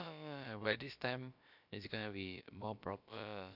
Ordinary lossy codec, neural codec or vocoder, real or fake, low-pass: none; codec, 16 kHz, about 1 kbps, DyCAST, with the encoder's durations; fake; 5.4 kHz